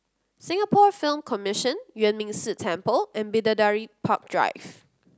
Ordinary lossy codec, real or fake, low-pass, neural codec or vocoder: none; real; none; none